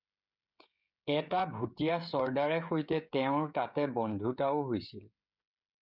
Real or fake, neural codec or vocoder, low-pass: fake; codec, 16 kHz, 16 kbps, FreqCodec, smaller model; 5.4 kHz